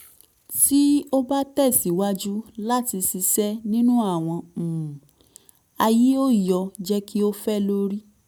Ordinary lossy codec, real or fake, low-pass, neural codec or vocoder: none; real; none; none